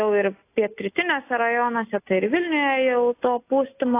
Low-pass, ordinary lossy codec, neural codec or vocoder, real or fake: 3.6 kHz; AAC, 24 kbps; none; real